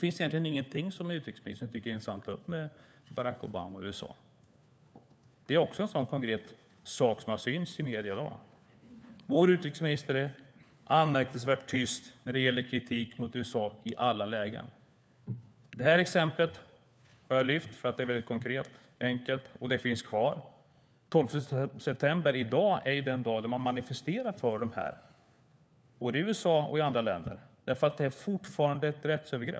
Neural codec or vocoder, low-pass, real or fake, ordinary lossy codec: codec, 16 kHz, 4 kbps, FunCodec, trained on LibriTTS, 50 frames a second; none; fake; none